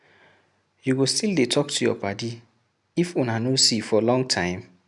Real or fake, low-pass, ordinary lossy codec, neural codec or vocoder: real; 10.8 kHz; none; none